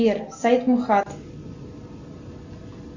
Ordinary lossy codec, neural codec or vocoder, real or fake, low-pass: Opus, 64 kbps; none; real; 7.2 kHz